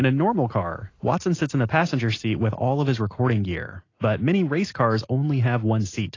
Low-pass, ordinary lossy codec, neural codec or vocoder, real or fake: 7.2 kHz; AAC, 32 kbps; none; real